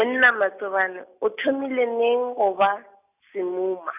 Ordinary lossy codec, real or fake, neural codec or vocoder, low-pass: none; real; none; 3.6 kHz